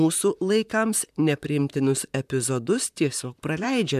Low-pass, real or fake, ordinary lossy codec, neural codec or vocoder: 14.4 kHz; fake; MP3, 96 kbps; vocoder, 44.1 kHz, 128 mel bands, Pupu-Vocoder